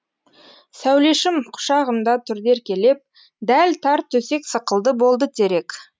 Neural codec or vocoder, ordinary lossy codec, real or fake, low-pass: none; none; real; none